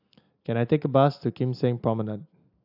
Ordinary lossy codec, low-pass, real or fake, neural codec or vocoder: AAC, 48 kbps; 5.4 kHz; real; none